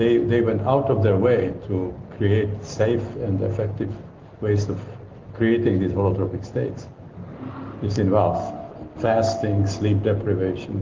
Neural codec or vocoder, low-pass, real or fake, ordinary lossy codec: none; 7.2 kHz; real; Opus, 16 kbps